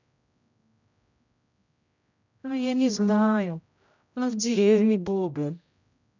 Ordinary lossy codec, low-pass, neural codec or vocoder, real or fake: none; 7.2 kHz; codec, 16 kHz, 0.5 kbps, X-Codec, HuBERT features, trained on general audio; fake